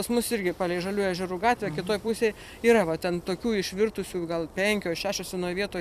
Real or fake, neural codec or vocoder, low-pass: fake; vocoder, 44.1 kHz, 128 mel bands every 256 samples, BigVGAN v2; 14.4 kHz